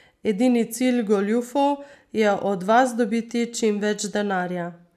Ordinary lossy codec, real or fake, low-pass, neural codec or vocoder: none; real; 14.4 kHz; none